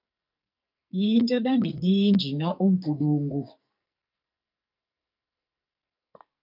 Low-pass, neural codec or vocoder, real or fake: 5.4 kHz; codec, 44.1 kHz, 2.6 kbps, SNAC; fake